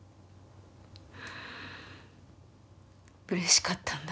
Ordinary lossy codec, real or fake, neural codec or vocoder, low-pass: none; real; none; none